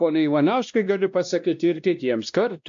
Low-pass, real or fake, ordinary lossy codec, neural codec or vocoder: 7.2 kHz; fake; AAC, 64 kbps; codec, 16 kHz, 1 kbps, X-Codec, WavLM features, trained on Multilingual LibriSpeech